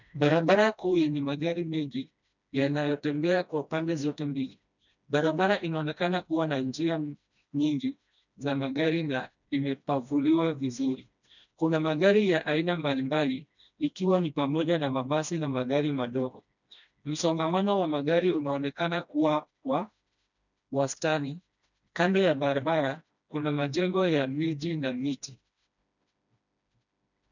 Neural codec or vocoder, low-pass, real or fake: codec, 16 kHz, 1 kbps, FreqCodec, smaller model; 7.2 kHz; fake